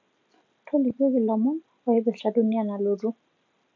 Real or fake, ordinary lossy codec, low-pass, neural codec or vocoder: real; MP3, 48 kbps; 7.2 kHz; none